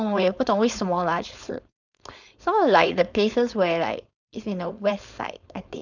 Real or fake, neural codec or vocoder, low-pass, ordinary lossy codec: fake; codec, 16 kHz, 4.8 kbps, FACodec; 7.2 kHz; none